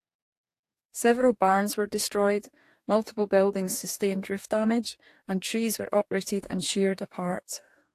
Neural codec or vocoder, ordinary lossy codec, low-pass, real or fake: codec, 44.1 kHz, 2.6 kbps, DAC; AAC, 64 kbps; 14.4 kHz; fake